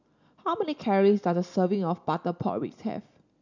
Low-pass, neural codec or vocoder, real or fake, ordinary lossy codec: 7.2 kHz; none; real; none